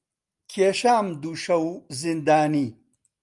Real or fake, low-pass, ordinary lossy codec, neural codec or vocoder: real; 10.8 kHz; Opus, 32 kbps; none